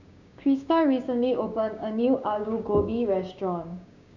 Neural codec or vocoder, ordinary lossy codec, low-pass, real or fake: codec, 16 kHz, 6 kbps, DAC; none; 7.2 kHz; fake